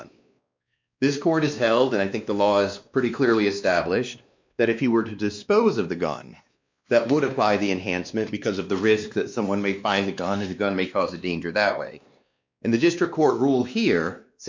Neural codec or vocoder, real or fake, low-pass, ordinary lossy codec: codec, 16 kHz, 2 kbps, X-Codec, WavLM features, trained on Multilingual LibriSpeech; fake; 7.2 kHz; MP3, 64 kbps